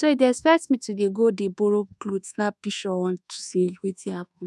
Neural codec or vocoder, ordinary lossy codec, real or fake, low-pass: codec, 24 kHz, 1.2 kbps, DualCodec; none; fake; none